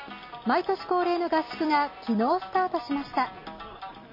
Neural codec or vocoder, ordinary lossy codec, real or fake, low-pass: none; MP3, 24 kbps; real; 5.4 kHz